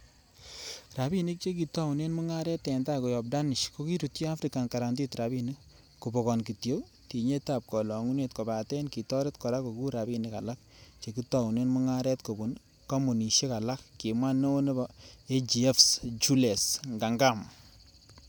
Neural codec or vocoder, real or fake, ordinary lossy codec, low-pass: none; real; none; none